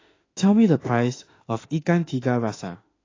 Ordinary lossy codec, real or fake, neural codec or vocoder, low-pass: AAC, 32 kbps; fake; autoencoder, 48 kHz, 32 numbers a frame, DAC-VAE, trained on Japanese speech; 7.2 kHz